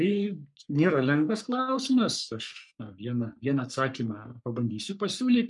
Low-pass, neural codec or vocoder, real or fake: 10.8 kHz; codec, 44.1 kHz, 3.4 kbps, Pupu-Codec; fake